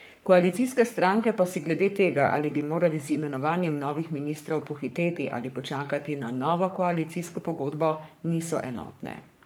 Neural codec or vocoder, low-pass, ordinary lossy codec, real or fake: codec, 44.1 kHz, 3.4 kbps, Pupu-Codec; none; none; fake